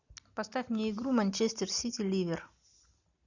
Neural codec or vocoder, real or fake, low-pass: none; real; 7.2 kHz